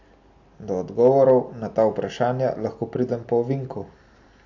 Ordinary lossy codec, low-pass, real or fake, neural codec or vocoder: AAC, 48 kbps; 7.2 kHz; real; none